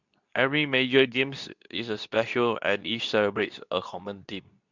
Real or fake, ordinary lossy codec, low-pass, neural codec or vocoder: fake; none; 7.2 kHz; codec, 24 kHz, 0.9 kbps, WavTokenizer, medium speech release version 2